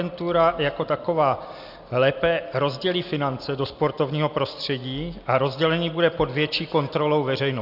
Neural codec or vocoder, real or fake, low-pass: none; real; 5.4 kHz